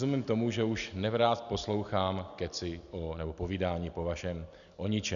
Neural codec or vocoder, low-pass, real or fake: none; 7.2 kHz; real